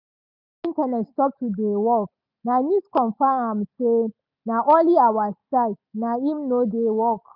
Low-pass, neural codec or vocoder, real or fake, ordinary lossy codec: 5.4 kHz; none; real; none